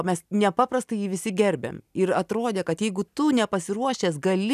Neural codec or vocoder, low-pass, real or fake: none; 14.4 kHz; real